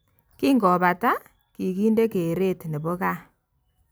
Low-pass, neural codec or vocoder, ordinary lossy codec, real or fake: none; none; none; real